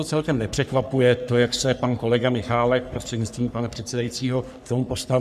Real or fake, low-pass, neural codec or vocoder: fake; 14.4 kHz; codec, 44.1 kHz, 3.4 kbps, Pupu-Codec